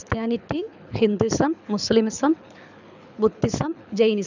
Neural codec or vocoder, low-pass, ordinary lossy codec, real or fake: codec, 24 kHz, 6 kbps, HILCodec; 7.2 kHz; none; fake